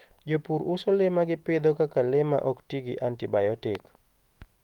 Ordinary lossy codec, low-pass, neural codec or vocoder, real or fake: Opus, 24 kbps; 19.8 kHz; autoencoder, 48 kHz, 128 numbers a frame, DAC-VAE, trained on Japanese speech; fake